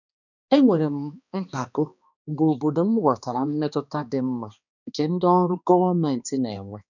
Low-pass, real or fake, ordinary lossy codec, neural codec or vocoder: 7.2 kHz; fake; none; codec, 16 kHz, 2 kbps, X-Codec, HuBERT features, trained on balanced general audio